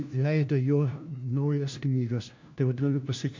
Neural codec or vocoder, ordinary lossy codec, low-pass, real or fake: codec, 16 kHz, 1 kbps, FunCodec, trained on LibriTTS, 50 frames a second; MP3, 64 kbps; 7.2 kHz; fake